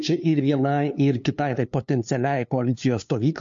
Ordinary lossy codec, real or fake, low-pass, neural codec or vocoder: MP3, 48 kbps; fake; 7.2 kHz; codec, 16 kHz, 2 kbps, FunCodec, trained on LibriTTS, 25 frames a second